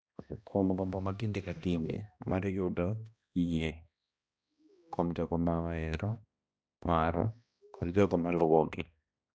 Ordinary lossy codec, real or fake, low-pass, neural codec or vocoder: none; fake; none; codec, 16 kHz, 1 kbps, X-Codec, HuBERT features, trained on balanced general audio